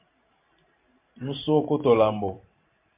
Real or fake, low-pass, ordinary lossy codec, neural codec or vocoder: fake; 3.6 kHz; MP3, 32 kbps; vocoder, 44.1 kHz, 128 mel bands every 512 samples, BigVGAN v2